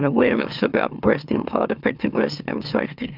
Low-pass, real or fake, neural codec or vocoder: 5.4 kHz; fake; autoencoder, 44.1 kHz, a latent of 192 numbers a frame, MeloTTS